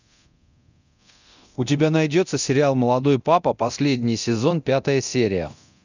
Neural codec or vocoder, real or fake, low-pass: codec, 24 kHz, 0.9 kbps, DualCodec; fake; 7.2 kHz